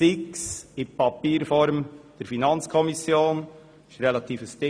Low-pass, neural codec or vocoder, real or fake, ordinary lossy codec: none; none; real; none